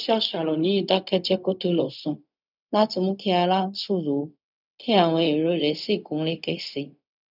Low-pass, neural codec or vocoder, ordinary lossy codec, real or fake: 5.4 kHz; codec, 16 kHz, 0.4 kbps, LongCat-Audio-Codec; none; fake